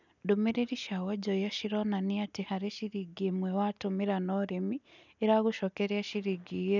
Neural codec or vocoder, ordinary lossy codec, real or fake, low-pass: none; none; real; 7.2 kHz